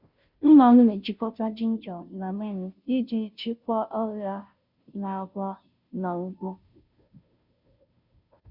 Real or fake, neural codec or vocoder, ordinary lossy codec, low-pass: fake; codec, 16 kHz, 0.5 kbps, FunCodec, trained on Chinese and English, 25 frames a second; none; 5.4 kHz